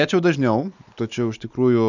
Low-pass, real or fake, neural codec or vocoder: 7.2 kHz; real; none